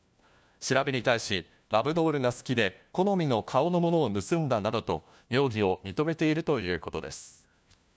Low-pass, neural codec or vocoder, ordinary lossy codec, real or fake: none; codec, 16 kHz, 1 kbps, FunCodec, trained on LibriTTS, 50 frames a second; none; fake